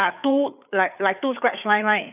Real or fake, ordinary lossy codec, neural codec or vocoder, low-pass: fake; none; codec, 16 kHz, 4 kbps, FreqCodec, larger model; 3.6 kHz